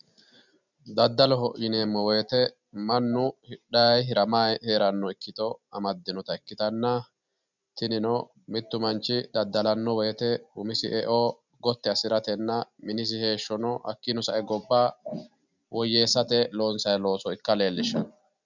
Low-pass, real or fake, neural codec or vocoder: 7.2 kHz; real; none